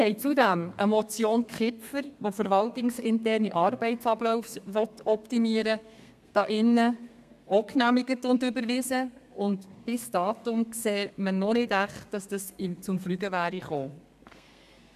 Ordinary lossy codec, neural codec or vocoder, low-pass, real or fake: MP3, 96 kbps; codec, 32 kHz, 1.9 kbps, SNAC; 14.4 kHz; fake